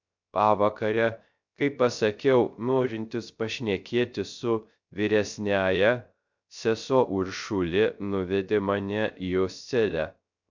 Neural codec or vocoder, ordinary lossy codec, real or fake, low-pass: codec, 16 kHz, 0.3 kbps, FocalCodec; MP3, 64 kbps; fake; 7.2 kHz